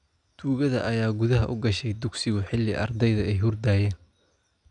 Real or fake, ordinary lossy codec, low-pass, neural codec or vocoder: real; none; 9.9 kHz; none